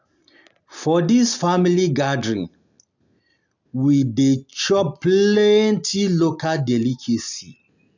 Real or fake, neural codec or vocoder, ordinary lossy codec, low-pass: real; none; none; 7.2 kHz